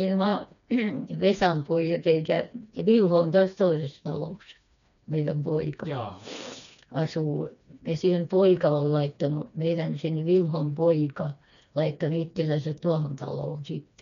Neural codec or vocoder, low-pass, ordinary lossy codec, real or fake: codec, 16 kHz, 2 kbps, FreqCodec, smaller model; 7.2 kHz; none; fake